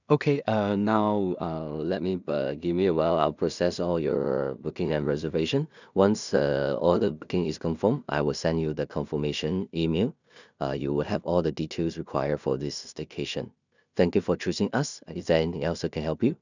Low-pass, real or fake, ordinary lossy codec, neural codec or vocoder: 7.2 kHz; fake; none; codec, 16 kHz in and 24 kHz out, 0.4 kbps, LongCat-Audio-Codec, two codebook decoder